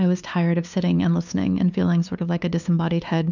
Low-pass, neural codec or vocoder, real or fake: 7.2 kHz; codec, 16 kHz, 2 kbps, FunCodec, trained on LibriTTS, 25 frames a second; fake